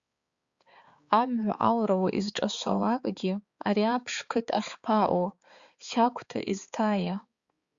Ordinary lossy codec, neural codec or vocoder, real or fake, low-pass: Opus, 64 kbps; codec, 16 kHz, 4 kbps, X-Codec, HuBERT features, trained on balanced general audio; fake; 7.2 kHz